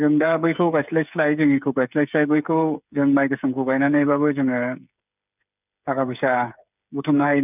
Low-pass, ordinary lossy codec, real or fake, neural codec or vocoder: 3.6 kHz; none; fake; codec, 16 kHz, 8 kbps, FreqCodec, smaller model